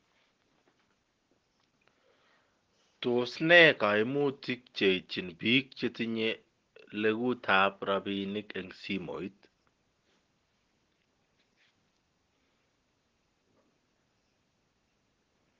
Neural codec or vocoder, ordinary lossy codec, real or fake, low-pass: none; Opus, 16 kbps; real; 7.2 kHz